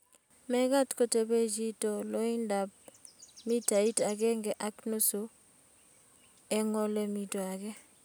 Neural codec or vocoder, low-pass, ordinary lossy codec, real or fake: none; none; none; real